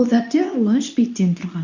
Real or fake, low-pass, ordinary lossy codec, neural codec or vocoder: fake; 7.2 kHz; none; codec, 24 kHz, 0.9 kbps, WavTokenizer, medium speech release version 2